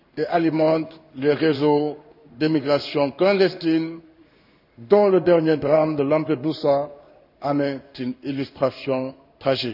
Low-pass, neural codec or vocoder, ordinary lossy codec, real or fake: 5.4 kHz; codec, 16 kHz in and 24 kHz out, 1 kbps, XY-Tokenizer; none; fake